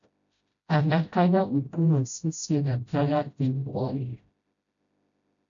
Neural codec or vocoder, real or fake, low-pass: codec, 16 kHz, 0.5 kbps, FreqCodec, smaller model; fake; 7.2 kHz